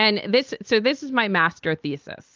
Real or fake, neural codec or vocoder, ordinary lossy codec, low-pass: real; none; Opus, 32 kbps; 7.2 kHz